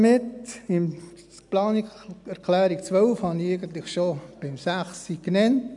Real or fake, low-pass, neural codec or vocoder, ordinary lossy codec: real; 10.8 kHz; none; none